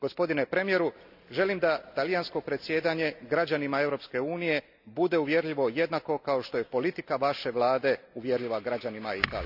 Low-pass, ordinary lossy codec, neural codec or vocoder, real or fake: 5.4 kHz; none; none; real